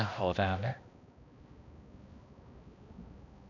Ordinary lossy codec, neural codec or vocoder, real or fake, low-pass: none; codec, 16 kHz, 1 kbps, X-Codec, HuBERT features, trained on balanced general audio; fake; 7.2 kHz